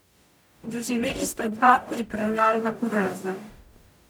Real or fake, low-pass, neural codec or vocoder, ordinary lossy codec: fake; none; codec, 44.1 kHz, 0.9 kbps, DAC; none